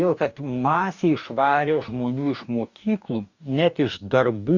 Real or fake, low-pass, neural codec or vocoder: fake; 7.2 kHz; codec, 44.1 kHz, 2.6 kbps, DAC